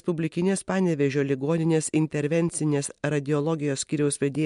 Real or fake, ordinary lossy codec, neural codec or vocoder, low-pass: real; MP3, 64 kbps; none; 10.8 kHz